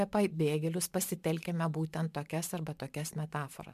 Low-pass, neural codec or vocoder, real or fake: 14.4 kHz; vocoder, 44.1 kHz, 128 mel bands every 512 samples, BigVGAN v2; fake